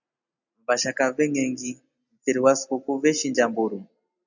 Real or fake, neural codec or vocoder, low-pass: real; none; 7.2 kHz